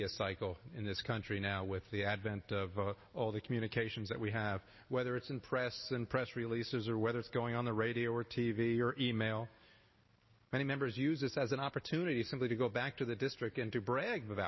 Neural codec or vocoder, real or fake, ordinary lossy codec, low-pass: none; real; MP3, 24 kbps; 7.2 kHz